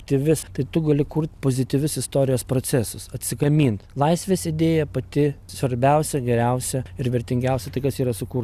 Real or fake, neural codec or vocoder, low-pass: real; none; 14.4 kHz